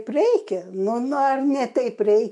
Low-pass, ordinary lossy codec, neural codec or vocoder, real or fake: 10.8 kHz; MP3, 48 kbps; autoencoder, 48 kHz, 128 numbers a frame, DAC-VAE, trained on Japanese speech; fake